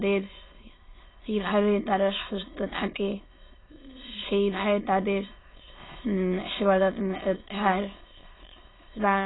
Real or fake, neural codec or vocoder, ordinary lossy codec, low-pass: fake; autoencoder, 22.05 kHz, a latent of 192 numbers a frame, VITS, trained on many speakers; AAC, 16 kbps; 7.2 kHz